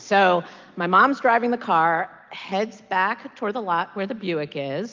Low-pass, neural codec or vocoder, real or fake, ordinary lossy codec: 7.2 kHz; none; real; Opus, 24 kbps